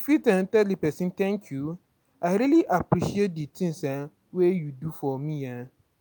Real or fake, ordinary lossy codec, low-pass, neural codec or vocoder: real; none; none; none